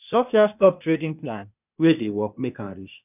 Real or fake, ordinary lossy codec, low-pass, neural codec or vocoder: fake; none; 3.6 kHz; codec, 16 kHz, 0.8 kbps, ZipCodec